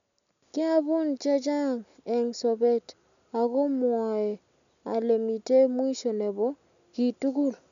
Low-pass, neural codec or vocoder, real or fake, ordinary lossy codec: 7.2 kHz; none; real; none